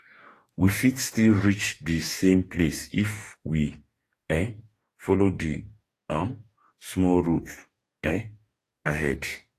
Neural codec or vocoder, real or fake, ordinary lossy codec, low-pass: codec, 44.1 kHz, 2.6 kbps, DAC; fake; AAC, 48 kbps; 14.4 kHz